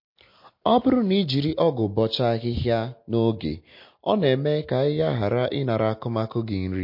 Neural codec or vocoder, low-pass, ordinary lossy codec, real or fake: none; 5.4 kHz; MP3, 32 kbps; real